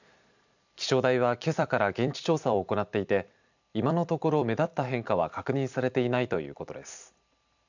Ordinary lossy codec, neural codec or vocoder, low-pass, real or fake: none; vocoder, 44.1 kHz, 128 mel bands every 256 samples, BigVGAN v2; 7.2 kHz; fake